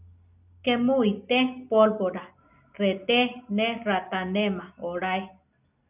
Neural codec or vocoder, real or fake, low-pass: none; real; 3.6 kHz